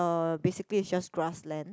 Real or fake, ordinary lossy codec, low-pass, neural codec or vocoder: real; none; none; none